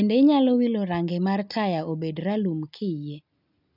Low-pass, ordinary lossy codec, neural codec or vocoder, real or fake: 5.4 kHz; none; none; real